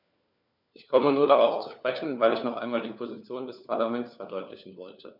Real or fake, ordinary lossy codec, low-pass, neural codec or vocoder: fake; none; 5.4 kHz; codec, 16 kHz, 4 kbps, FunCodec, trained on LibriTTS, 50 frames a second